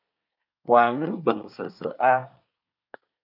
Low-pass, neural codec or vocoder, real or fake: 5.4 kHz; codec, 24 kHz, 1 kbps, SNAC; fake